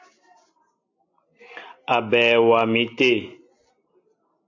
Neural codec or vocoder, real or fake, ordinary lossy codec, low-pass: none; real; AAC, 48 kbps; 7.2 kHz